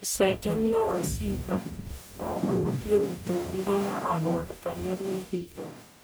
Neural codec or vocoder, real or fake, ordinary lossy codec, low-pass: codec, 44.1 kHz, 0.9 kbps, DAC; fake; none; none